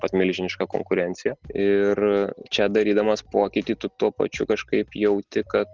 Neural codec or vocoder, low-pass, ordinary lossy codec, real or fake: none; 7.2 kHz; Opus, 16 kbps; real